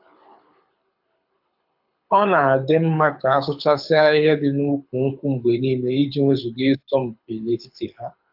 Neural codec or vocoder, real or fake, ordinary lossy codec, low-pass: codec, 24 kHz, 6 kbps, HILCodec; fake; AAC, 48 kbps; 5.4 kHz